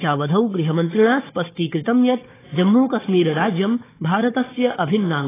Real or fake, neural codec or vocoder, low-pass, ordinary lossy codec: fake; codec, 16 kHz, 4 kbps, FunCodec, trained on Chinese and English, 50 frames a second; 3.6 kHz; AAC, 16 kbps